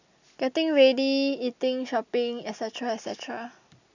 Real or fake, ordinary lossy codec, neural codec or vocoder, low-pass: real; none; none; 7.2 kHz